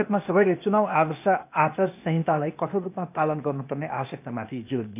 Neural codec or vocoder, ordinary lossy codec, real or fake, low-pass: codec, 16 kHz, 0.8 kbps, ZipCodec; none; fake; 3.6 kHz